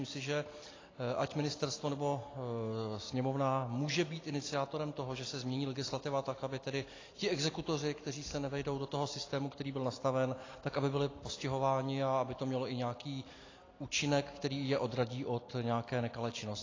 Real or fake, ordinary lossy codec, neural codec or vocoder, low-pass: real; AAC, 32 kbps; none; 7.2 kHz